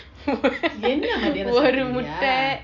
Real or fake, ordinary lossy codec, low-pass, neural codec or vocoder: real; MP3, 64 kbps; 7.2 kHz; none